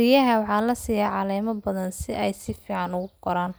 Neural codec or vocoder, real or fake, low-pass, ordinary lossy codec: none; real; none; none